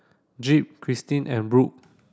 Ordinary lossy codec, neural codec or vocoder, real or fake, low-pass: none; none; real; none